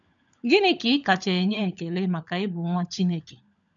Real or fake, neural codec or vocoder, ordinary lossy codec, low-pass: fake; codec, 16 kHz, 16 kbps, FunCodec, trained on LibriTTS, 50 frames a second; none; 7.2 kHz